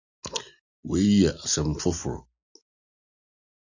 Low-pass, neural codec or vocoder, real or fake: 7.2 kHz; none; real